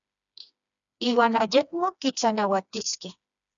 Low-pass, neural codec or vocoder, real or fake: 7.2 kHz; codec, 16 kHz, 2 kbps, FreqCodec, smaller model; fake